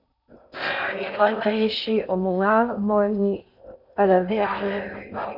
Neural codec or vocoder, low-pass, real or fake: codec, 16 kHz in and 24 kHz out, 0.6 kbps, FocalCodec, streaming, 4096 codes; 5.4 kHz; fake